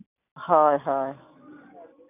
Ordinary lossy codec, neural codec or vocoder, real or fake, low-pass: none; none; real; 3.6 kHz